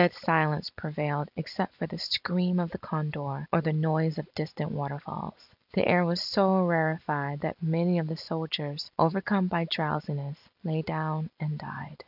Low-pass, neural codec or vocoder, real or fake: 5.4 kHz; none; real